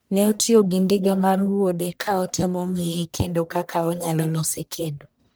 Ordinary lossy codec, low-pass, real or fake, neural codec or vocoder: none; none; fake; codec, 44.1 kHz, 1.7 kbps, Pupu-Codec